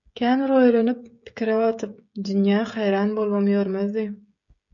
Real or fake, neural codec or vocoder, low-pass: fake; codec, 16 kHz, 16 kbps, FreqCodec, smaller model; 7.2 kHz